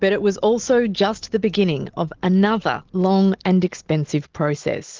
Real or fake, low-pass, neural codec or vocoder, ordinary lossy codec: real; 7.2 kHz; none; Opus, 16 kbps